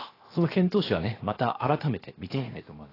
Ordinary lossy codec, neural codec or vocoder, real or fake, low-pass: AAC, 24 kbps; codec, 16 kHz, 0.7 kbps, FocalCodec; fake; 5.4 kHz